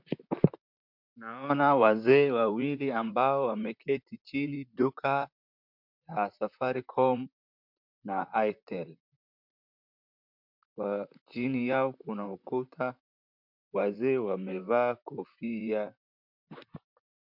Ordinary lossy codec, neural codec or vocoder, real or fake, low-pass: MP3, 48 kbps; vocoder, 44.1 kHz, 128 mel bands, Pupu-Vocoder; fake; 5.4 kHz